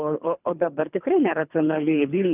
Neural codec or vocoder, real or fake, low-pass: codec, 24 kHz, 3 kbps, HILCodec; fake; 3.6 kHz